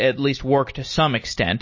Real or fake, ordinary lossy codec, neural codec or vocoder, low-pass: real; MP3, 32 kbps; none; 7.2 kHz